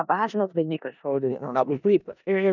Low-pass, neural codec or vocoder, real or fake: 7.2 kHz; codec, 16 kHz in and 24 kHz out, 0.4 kbps, LongCat-Audio-Codec, four codebook decoder; fake